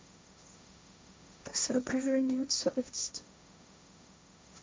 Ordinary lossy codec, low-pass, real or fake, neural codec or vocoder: none; none; fake; codec, 16 kHz, 1.1 kbps, Voila-Tokenizer